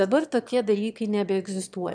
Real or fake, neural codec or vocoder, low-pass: fake; autoencoder, 22.05 kHz, a latent of 192 numbers a frame, VITS, trained on one speaker; 9.9 kHz